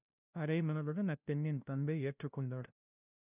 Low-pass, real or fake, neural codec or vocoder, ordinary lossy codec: 3.6 kHz; fake; codec, 16 kHz, 0.5 kbps, FunCodec, trained on LibriTTS, 25 frames a second; none